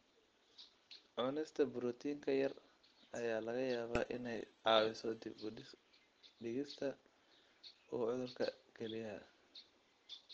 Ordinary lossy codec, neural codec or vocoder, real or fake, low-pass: Opus, 16 kbps; none; real; 7.2 kHz